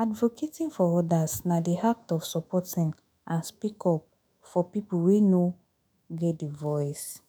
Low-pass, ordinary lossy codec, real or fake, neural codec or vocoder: none; none; fake; autoencoder, 48 kHz, 128 numbers a frame, DAC-VAE, trained on Japanese speech